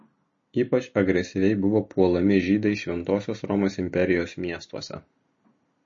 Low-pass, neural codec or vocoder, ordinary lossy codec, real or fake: 7.2 kHz; none; MP3, 32 kbps; real